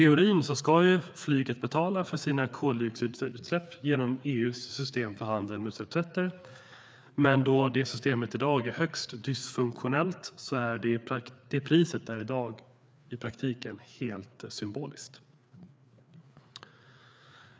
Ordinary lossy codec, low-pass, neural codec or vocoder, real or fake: none; none; codec, 16 kHz, 4 kbps, FreqCodec, larger model; fake